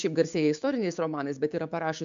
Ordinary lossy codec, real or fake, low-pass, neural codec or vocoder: MP3, 64 kbps; fake; 7.2 kHz; codec, 16 kHz, 6 kbps, DAC